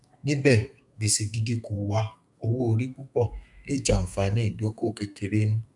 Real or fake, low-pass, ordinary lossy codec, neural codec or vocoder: fake; 10.8 kHz; none; codec, 32 kHz, 1.9 kbps, SNAC